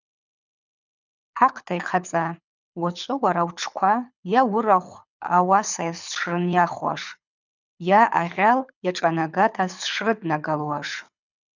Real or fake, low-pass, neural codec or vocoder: fake; 7.2 kHz; codec, 24 kHz, 6 kbps, HILCodec